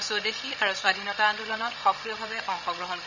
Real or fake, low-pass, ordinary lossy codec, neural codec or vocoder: fake; 7.2 kHz; none; codec, 16 kHz, 16 kbps, FreqCodec, larger model